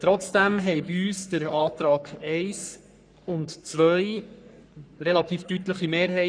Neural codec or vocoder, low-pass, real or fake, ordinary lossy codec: codec, 44.1 kHz, 3.4 kbps, Pupu-Codec; 9.9 kHz; fake; none